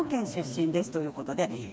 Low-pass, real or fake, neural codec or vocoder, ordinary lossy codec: none; fake; codec, 16 kHz, 2 kbps, FreqCodec, smaller model; none